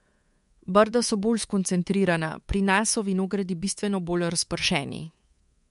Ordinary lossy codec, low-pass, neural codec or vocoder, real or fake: MP3, 64 kbps; 10.8 kHz; codec, 24 kHz, 3.1 kbps, DualCodec; fake